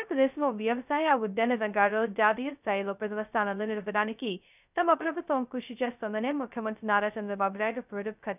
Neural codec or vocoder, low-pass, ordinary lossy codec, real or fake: codec, 16 kHz, 0.2 kbps, FocalCodec; 3.6 kHz; none; fake